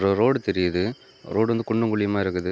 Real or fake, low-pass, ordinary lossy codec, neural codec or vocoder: real; none; none; none